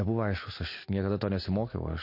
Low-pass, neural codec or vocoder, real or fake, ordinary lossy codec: 5.4 kHz; none; real; MP3, 32 kbps